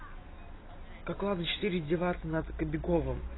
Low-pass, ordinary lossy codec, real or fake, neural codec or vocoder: 7.2 kHz; AAC, 16 kbps; real; none